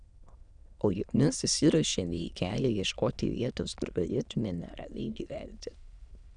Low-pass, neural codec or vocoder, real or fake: 9.9 kHz; autoencoder, 22.05 kHz, a latent of 192 numbers a frame, VITS, trained on many speakers; fake